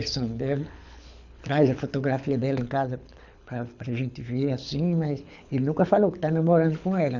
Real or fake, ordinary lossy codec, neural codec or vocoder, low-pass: fake; none; codec, 24 kHz, 6 kbps, HILCodec; 7.2 kHz